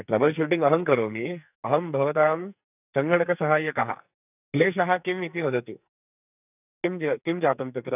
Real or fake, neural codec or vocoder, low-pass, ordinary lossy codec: fake; codec, 44.1 kHz, 2.6 kbps, SNAC; 3.6 kHz; none